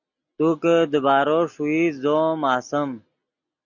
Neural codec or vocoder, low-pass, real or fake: none; 7.2 kHz; real